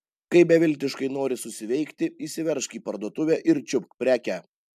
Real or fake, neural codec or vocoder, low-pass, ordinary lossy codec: real; none; 14.4 kHz; MP3, 96 kbps